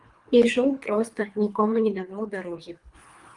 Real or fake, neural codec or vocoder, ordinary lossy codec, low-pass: fake; codec, 24 kHz, 3 kbps, HILCodec; Opus, 32 kbps; 10.8 kHz